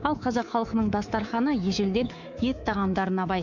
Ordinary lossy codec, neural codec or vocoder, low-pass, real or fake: none; codec, 24 kHz, 3.1 kbps, DualCodec; 7.2 kHz; fake